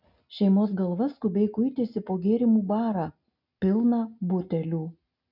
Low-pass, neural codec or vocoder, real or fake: 5.4 kHz; none; real